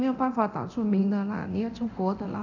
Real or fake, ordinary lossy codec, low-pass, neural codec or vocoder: fake; none; 7.2 kHz; codec, 24 kHz, 0.9 kbps, DualCodec